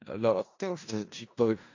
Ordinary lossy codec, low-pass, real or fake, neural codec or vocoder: AAC, 48 kbps; 7.2 kHz; fake; codec, 16 kHz in and 24 kHz out, 0.4 kbps, LongCat-Audio-Codec, four codebook decoder